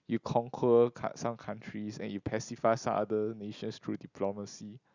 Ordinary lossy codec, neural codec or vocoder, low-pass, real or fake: Opus, 64 kbps; none; 7.2 kHz; real